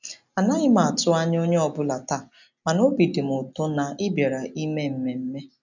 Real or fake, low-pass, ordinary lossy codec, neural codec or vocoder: real; 7.2 kHz; none; none